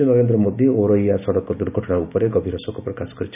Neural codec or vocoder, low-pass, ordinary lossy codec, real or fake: none; 3.6 kHz; none; real